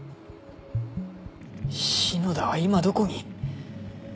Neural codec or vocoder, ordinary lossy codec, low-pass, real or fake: none; none; none; real